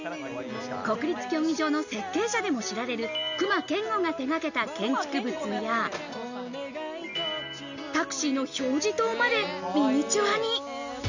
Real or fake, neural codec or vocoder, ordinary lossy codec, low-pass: real; none; none; 7.2 kHz